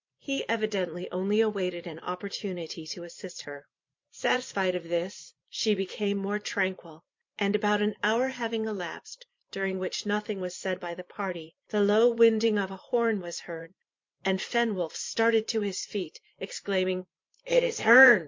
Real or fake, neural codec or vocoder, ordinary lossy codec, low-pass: fake; vocoder, 44.1 kHz, 128 mel bands every 512 samples, BigVGAN v2; MP3, 48 kbps; 7.2 kHz